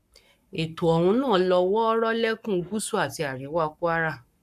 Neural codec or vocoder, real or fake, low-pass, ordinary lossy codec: codec, 44.1 kHz, 7.8 kbps, Pupu-Codec; fake; 14.4 kHz; none